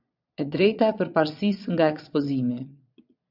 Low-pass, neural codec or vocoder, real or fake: 5.4 kHz; vocoder, 22.05 kHz, 80 mel bands, Vocos; fake